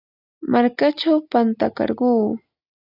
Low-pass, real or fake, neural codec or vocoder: 5.4 kHz; real; none